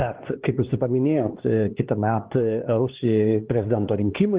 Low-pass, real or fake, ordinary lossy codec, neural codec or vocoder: 3.6 kHz; fake; Opus, 16 kbps; codec, 16 kHz, 2 kbps, X-Codec, HuBERT features, trained on LibriSpeech